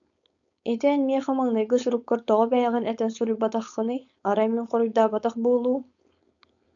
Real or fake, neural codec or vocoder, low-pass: fake; codec, 16 kHz, 4.8 kbps, FACodec; 7.2 kHz